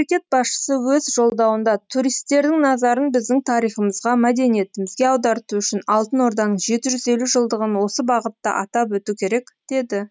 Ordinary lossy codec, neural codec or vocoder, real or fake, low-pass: none; none; real; none